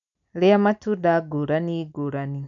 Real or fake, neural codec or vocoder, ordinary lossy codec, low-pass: real; none; none; 7.2 kHz